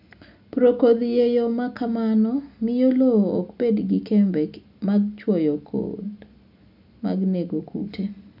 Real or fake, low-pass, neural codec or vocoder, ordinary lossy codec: real; 5.4 kHz; none; none